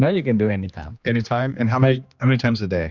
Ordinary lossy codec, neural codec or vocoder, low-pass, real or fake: Opus, 64 kbps; codec, 16 kHz, 2 kbps, X-Codec, HuBERT features, trained on general audio; 7.2 kHz; fake